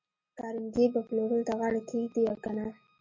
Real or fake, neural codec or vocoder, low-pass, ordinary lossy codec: real; none; 7.2 kHz; MP3, 32 kbps